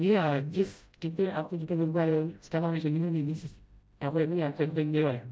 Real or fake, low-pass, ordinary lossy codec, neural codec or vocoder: fake; none; none; codec, 16 kHz, 0.5 kbps, FreqCodec, smaller model